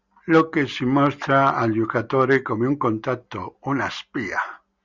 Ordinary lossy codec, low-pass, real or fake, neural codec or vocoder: Opus, 64 kbps; 7.2 kHz; real; none